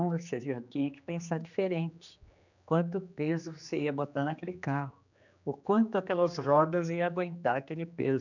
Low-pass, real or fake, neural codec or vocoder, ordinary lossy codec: 7.2 kHz; fake; codec, 16 kHz, 2 kbps, X-Codec, HuBERT features, trained on general audio; none